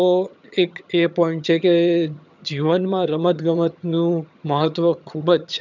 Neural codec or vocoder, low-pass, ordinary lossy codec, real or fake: vocoder, 22.05 kHz, 80 mel bands, HiFi-GAN; 7.2 kHz; none; fake